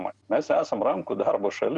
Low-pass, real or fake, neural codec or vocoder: 10.8 kHz; real; none